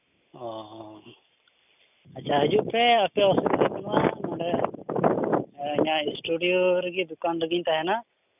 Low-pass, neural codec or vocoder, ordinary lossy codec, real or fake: 3.6 kHz; none; none; real